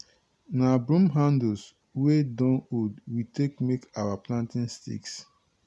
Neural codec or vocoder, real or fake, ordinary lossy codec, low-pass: none; real; none; none